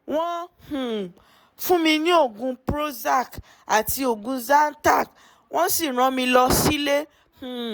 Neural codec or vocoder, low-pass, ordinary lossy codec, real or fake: none; none; none; real